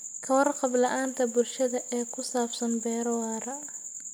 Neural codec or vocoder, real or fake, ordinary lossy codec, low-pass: none; real; none; none